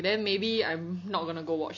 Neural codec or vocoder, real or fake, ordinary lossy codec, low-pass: none; real; AAC, 48 kbps; 7.2 kHz